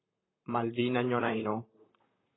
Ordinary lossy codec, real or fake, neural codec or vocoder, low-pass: AAC, 16 kbps; fake; vocoder, 44.1 kHz, 128 mel bands, Pupu-Vocoder; 7.2 kHz